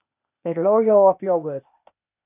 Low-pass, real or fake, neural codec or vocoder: 3.6 kHz; fake; codec, 16 kHz, 0.8 kbps, ZipCodec